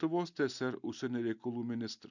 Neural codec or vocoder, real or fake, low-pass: none; real; 7.2 kHz